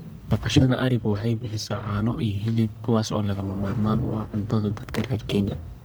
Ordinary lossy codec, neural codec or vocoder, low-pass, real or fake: none; codec, 44.1 kHz, 1.7 kbps, Pupu-Codec; none; fake